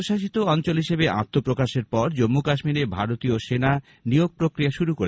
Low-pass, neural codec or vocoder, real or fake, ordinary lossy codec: none; none; real; none